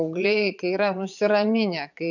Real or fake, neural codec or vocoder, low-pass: fake; vocoder, 22.05 kHz, 80 mel bands, Vocos; 7.2 kHz